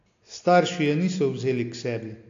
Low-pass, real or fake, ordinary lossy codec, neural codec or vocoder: 7.2 kHz; real; AAC, 48 kbps; none